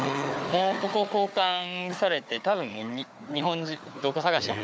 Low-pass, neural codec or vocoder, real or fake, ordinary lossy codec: none; codec, 16 kHz, 4 kbps, FunCodec, trained on Chinese and English, 50 frames a second; fake; none